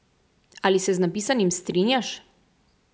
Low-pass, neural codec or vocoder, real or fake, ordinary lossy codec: none; none; real; none